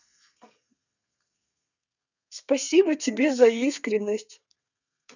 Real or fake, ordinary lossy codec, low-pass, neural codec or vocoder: fake; none; 7.2 kHz; codec, 32 kHz, 1.9 kbps, SNAC